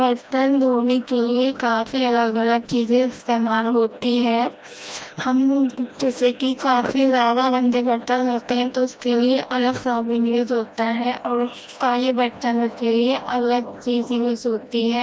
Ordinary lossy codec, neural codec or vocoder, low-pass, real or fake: none; codec, 16 kHz, 1 kbps, FreqCodec, smaller model; none; fake